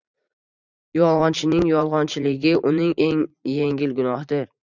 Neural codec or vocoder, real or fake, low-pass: vocoder, 44.1 kHz, 80 mel bands, Vocos; fake; 7.2 kHz